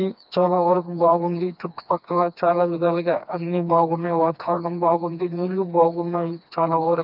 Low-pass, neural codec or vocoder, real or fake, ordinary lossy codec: 5.4 kHz; codec, 16 kHz, 2 kbps, FreqCodec, smaller model; fake; none